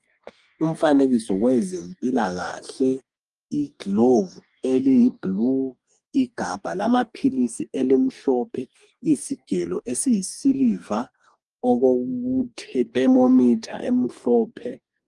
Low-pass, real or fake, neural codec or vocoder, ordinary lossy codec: 10.8 kHz; fake; codec, 44.1 kHz, 2.6 kbps, DAC; Opus, 32 kbps